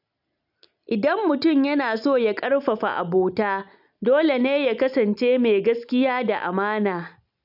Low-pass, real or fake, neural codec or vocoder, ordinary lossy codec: 5.4 kHz; real; none; none